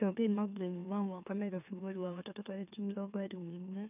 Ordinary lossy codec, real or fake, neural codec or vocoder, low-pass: none; fake; autoencoder, 44.1 kHz, a latent of 192 numbers a frame, MeloTTS; 3.6 kHz